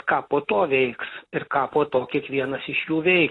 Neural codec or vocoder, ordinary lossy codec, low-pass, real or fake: none; AAC, 32 kbps; 10.8 kHz; real